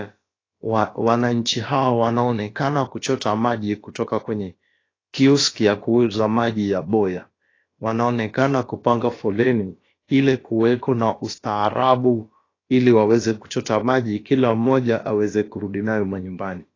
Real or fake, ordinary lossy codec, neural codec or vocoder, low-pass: fake; AAC, 32 kbps; codec, 16 kHz, about 1 kbps, DyCAST, with the encoder's durations; 7.2 kHz